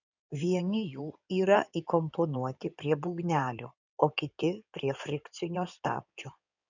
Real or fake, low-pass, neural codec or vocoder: fake; 7.2 kHz; codec, 16 kHz in and 24 kHz out, 2.2 kbps, FireRedTTS-2 codec